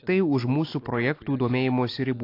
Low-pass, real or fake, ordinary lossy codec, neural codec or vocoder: 5.4 kHz; real; AAC, 32 kbps; none